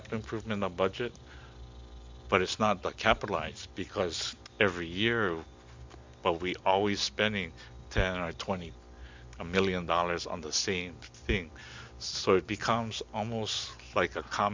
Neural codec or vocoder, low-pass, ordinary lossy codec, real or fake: none; 7.2 kHz; MP3, 64 kbps; real